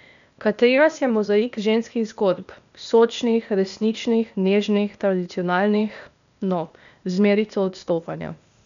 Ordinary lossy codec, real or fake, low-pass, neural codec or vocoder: none; fake; 7.2 kHz; codec, 16 kHz, 0.8 kbps, ZipCodec